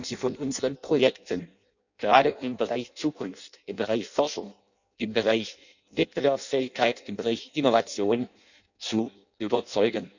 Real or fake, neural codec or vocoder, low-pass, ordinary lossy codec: fake; codec, 16 kHz in and 24 kHz out, 0.6 kbps, FireRedTTS-2 codec; 7.2 kHz; none